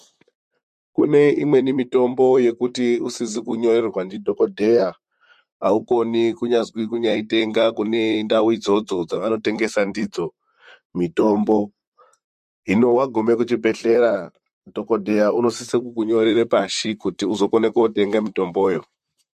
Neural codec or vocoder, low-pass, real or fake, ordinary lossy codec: vocoder, 44.1 kHz, 128 mel bands, Pupu-Vocoder; 14.4 kHz; fake; MP3, 64 kbps